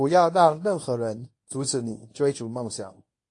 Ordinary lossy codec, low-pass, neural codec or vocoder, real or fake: AAC, 48 kbps; 10.8 kHz; codec, 24 kHz, 0.9 kbps, WavTokenizer, medium speech release version 1; fake